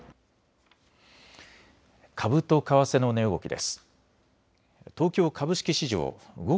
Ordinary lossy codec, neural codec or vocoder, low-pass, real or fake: none; none; none; real